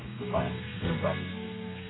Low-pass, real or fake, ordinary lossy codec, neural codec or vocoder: 7.2 kHz; fake; AAC, 16 kbps; codec, 32 kHz, 1.9 kbps, SNAC